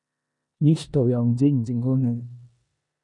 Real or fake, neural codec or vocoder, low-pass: fake; codec, 16 kHz in and 24 kHz out, 0.9 kbps, LongCat-Audio-Codec, four codebook decoder; 10.8 kHz